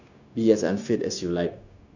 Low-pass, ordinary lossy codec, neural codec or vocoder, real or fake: 7.2 kHz; none; codec, 16 kHz, 0.9 kbps, LongCat-Audio-Codec; fake